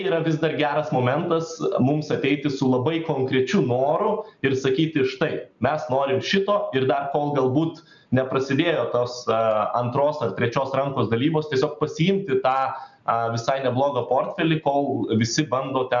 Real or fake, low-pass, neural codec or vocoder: real; 7.2 kHz; none